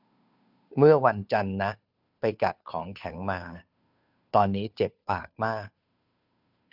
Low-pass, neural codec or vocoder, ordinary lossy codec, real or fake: 5.4 kHz; codec, 16 kHz, 2 kbps, FunCodec, trained on Chinese and English, 25 frames a second; none; fake